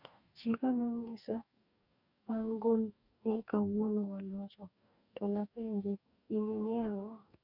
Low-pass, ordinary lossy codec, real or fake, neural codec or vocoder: 5.4 kHz; none; fake; codec, 44.1 kHz, 2.6 kbps, DAC